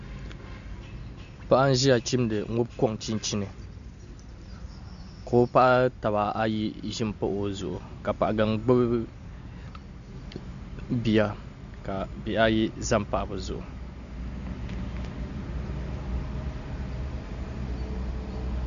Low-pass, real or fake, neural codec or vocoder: 7.2 kHz; real; none